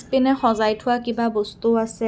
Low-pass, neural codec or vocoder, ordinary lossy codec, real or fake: none; none; none; real